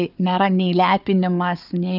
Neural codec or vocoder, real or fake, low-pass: codec, 16 kHz, 4 kbps, FunCodec, trained on Chinese and English, 50 frames a second; fake; 5.4 kHz